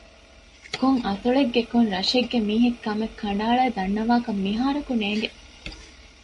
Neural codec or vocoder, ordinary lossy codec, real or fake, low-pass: none; MP3, 48 kbps; real; 14.4 kHz